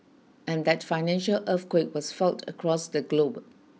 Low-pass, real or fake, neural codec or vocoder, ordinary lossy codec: none; real; none; none